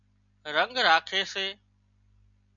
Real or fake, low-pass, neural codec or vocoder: real; 7.2 kHz; none